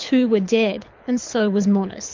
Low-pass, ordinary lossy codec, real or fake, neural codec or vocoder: 7.2 kHz; MP3, 64 kbps; fake; codec, 24 kHz, 6 kbps, HILCodec